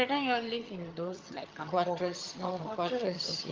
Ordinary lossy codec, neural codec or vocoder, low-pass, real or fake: Opus, 32 kbps; vocoder, 22.05 kHz, 80 mel bands, HiFi-GAN; 7.2 kHz; fake